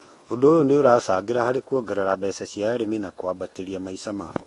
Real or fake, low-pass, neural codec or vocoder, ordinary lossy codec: fake; 10.8 kHz; codec, 24 kHz, 1.2 kbps, DualCodec; AAC, 32 kbps